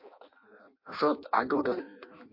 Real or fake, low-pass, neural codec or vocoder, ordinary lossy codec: fake; 5.4 kHz; codec, 16 kHz in and 24 kHz out, 0.6 kbps, FireRedTTS-2 codec; MP3, 32 kbps